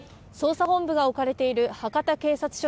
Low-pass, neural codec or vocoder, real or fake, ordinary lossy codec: none; none; real; none